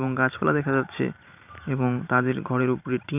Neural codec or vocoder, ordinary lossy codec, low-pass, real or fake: vocoder, 44.1 kHz, 128 mel bands every 512 samples, BigVGAN v2; none; 3.6 kHz; fake